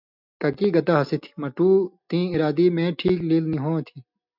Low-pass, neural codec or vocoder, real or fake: 5.4 kHz; none; real